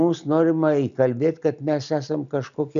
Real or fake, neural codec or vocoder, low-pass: real; none; 7.2 kHz